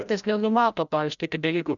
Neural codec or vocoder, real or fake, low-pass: codec, 16 kHz, 0.5 kbps, FreqCodec, larger model; fake; 7.2 kHz